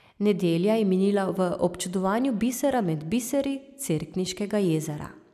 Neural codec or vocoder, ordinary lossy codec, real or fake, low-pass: none; none; real; 14.4 kHz